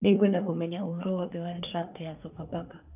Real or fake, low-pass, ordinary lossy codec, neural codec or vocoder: fake; 3.6 kHz; none; codec, 24 kHz, 1 kbps, SNAC